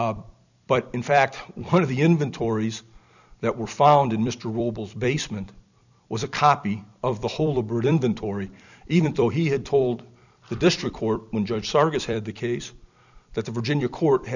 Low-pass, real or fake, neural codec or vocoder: 7.2 kHz; fake; vocoder, 44.1 kHz, 128 mel bands every 256 samples, BigVGAN v2